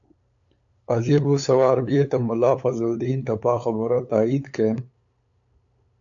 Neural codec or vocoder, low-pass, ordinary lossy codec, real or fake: codec, 16 kHz, 8 kbps, FunCodec, trained on LibriTTS, 25 frames a second; 7.2 kHz; AAC, 64 kbps; fake